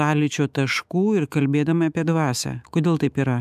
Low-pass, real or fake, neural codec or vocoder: 14.4 kHz; fake; autoencoder, 48 kHz, 128 numbers a frame, DAC-VAE, trained on Japanese speech